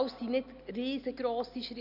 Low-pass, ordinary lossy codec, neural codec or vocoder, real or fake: 5.4 kHz; none; none; real